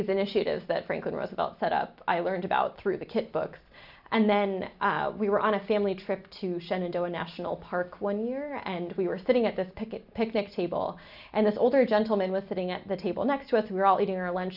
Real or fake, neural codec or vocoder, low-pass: real; none; 5.4 kHz